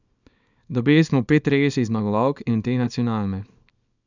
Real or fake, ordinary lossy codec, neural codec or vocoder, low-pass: fake; none; codec, 24 kHz, 0.9 kbps, WavTokenizer, small release; 7.2 kHz